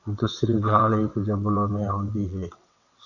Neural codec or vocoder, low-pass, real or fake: vocoder, 22.05 kHz, 80 mel bands, WaveNeXt; 7.2 kHz; fake